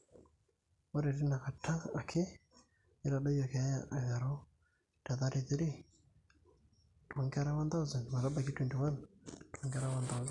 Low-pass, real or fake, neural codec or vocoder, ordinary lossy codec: 10.8 kHz; real; none; none